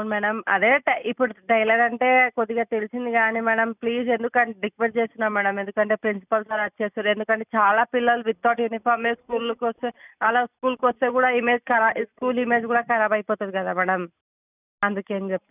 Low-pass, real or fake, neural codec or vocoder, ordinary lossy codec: 3.6 kHz; real; none; none